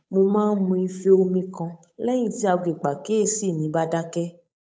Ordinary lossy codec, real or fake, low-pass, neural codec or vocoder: none; fake; none; codec, 16 kHz, 8 kbps, FunCodec, trained on Chinese and English, 25 frames a second